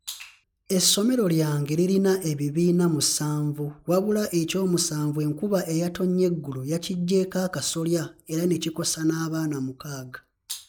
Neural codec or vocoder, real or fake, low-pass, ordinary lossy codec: none; real; none; none